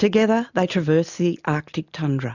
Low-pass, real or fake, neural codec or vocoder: 7.2 kHz; real; none